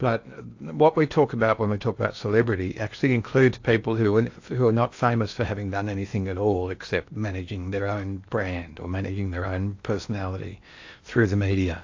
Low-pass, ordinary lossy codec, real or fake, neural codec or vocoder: 7.2 kHz; AAC, 48 kbps; fake; codec, 16 kHz in and 24 kHz out, 0.8 kbps, FocalCodec, streaming, 65536 codes